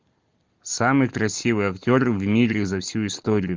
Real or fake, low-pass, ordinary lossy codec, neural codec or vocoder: real; 7.2 kHz; Opus, 24 kbps; none